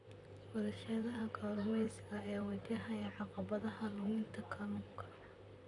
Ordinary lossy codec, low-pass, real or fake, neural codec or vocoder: none; 10.8 kHz; fake; vocoder, 44.1 kHz, 128 mel bands, Pupu-Vocoder